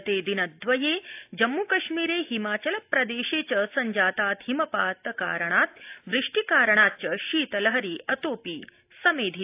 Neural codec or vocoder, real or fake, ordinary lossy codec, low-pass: none; real; AAC, 32 kbps; 3.6 kHz